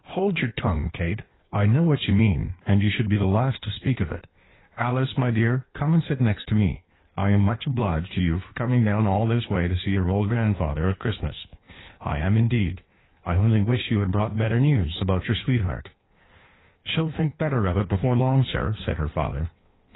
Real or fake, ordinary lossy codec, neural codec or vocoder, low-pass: fake; AAC, 16 kbps; codec, 16 kHz in and 24 kHz out, 1.1 kbps, FireRedTTS-2 codec; 7.2 kHz